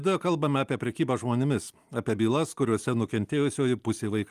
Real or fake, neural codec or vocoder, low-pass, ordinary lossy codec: real; none; 14.4 kHz; Opus, 32 kbps